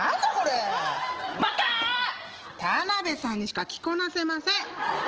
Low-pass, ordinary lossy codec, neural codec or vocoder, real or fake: 7.2 kHz; Opus, 16 kbps; none; real